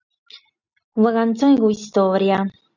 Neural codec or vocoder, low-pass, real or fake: none; 7.2 kHz; real